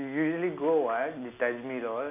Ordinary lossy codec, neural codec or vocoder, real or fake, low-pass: none; none; real; 3.6 kHz